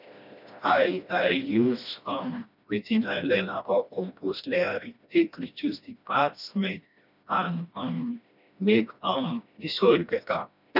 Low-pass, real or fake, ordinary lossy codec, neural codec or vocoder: 5.4 kHz; fake; none; codec, 16 kHz, 1 kbps, FreqCodec, smaller model